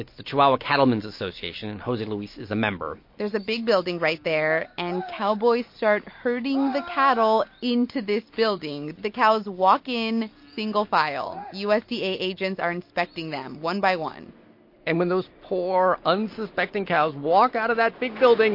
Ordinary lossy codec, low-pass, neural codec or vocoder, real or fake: MP3, 32 kbps; 5.4 kHz; none; real